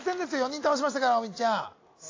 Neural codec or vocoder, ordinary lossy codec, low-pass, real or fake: none; AAC, 32 kbps; 7.2 kHz; real